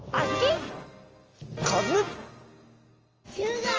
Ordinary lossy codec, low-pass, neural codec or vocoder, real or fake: Opus, 24 kbps; 7.2 kHz; none; real